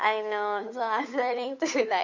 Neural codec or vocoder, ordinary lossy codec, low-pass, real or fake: codec, 16 kHz, 8 kbps, FunCodec, trained on Chinese and English, 25 frames a second; MP3, 48 kbps; 7.2 kHz; fake